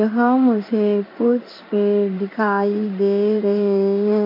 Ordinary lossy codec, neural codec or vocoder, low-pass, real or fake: MP3, 32 kbps; codec, 16 kHz in and 24 kHz out, 1 kbps, XY-Tokenizer; 5.4 kHz; fake